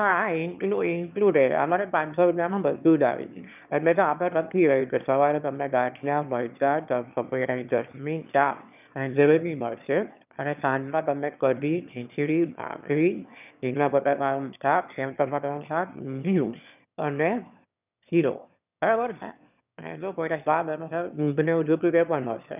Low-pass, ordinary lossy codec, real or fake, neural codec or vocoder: 3.6 kHz; none; fake; autoencoder, 22.05 kHz, a latent of 192 numbers a frame, VITS, trained on one speaker